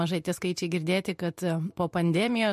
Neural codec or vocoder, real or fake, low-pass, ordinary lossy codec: vocoder, 44.1 kHz, 128 mel bands every 512 samples, BigVGAN v2; fake; 14.4 kHz; MP3, 64 kbps